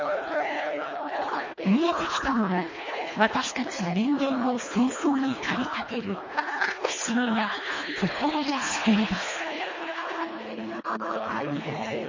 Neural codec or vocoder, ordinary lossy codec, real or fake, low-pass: codec, 24 kHz, 1.5 kbps, HILCodec; MP3, 32 kbps; fake; 7.2 kHz